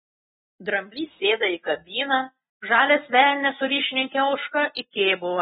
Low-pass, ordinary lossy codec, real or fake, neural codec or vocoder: 7.2 kHz; AAC, 16 kbps; real; none